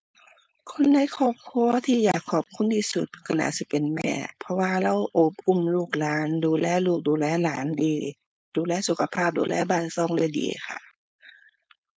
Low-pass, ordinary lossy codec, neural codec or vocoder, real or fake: none; none; codec, 16 kHz, 4.8 kbps, FACodec; fake